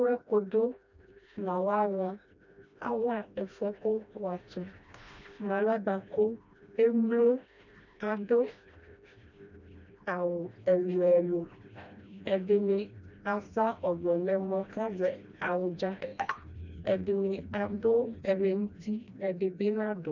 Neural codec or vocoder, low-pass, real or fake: codec, 16 kHz, 1 kbps, FreqCodec, smaller model; 7.2 kHz; fake